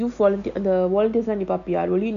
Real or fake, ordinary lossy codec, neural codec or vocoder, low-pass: real; none; none; 7.2 kHz